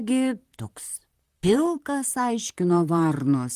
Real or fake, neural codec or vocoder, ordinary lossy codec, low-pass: fake; vocoder, 44.1 kHz, 128 mel bands, Pupu-Vocoder; Opus, 24 kbps; 14.4 kHz